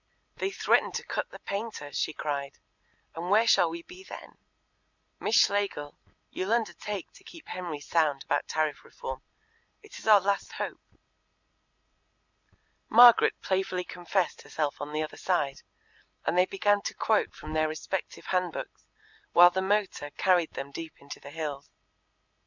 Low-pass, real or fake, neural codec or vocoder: 7.2 kHz; real; none